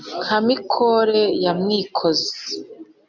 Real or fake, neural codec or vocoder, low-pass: real; none; 7.2 kHz